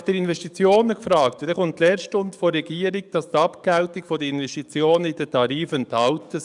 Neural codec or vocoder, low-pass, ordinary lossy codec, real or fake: codec, 44.1 kHz, 7.8 kbps, DAC; 10.8 kHz; none; fake